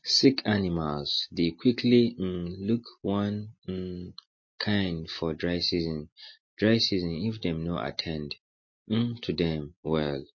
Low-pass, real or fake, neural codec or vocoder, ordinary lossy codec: 7.2 kHz; real; none; MP3, 32 kbps